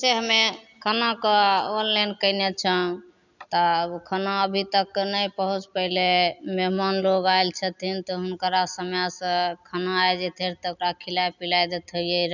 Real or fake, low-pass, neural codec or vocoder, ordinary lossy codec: real; 7.2 kHz; none; none